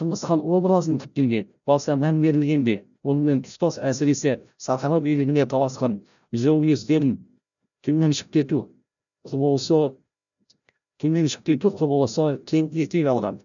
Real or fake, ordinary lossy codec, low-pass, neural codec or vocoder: fake; none; 7.2 kHz; codec, 16 kHz, 0.5 kbps, FreqCodec, larger model